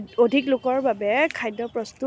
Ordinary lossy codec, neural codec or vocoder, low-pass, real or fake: none; none; none; real